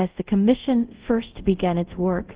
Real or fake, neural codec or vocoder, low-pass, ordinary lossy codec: fake; codec, 24 kHz, 0.5 kbps, DualCodec; 3.6 kHz; Opus, 16 kbps